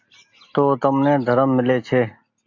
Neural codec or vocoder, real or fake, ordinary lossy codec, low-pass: none; real; AAC, 48 kbps; 7.2 kHz